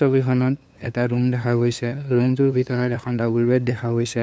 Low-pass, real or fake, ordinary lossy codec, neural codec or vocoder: none; fake; none; codec, 16 kHz, 2 kbps, FunCodec, trained on LibriTTS, 25 frames a second